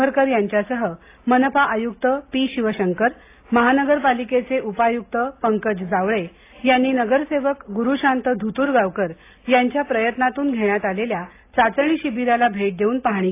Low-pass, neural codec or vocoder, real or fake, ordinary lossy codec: 3.6 kHz; none; real; AAC, 24 kbps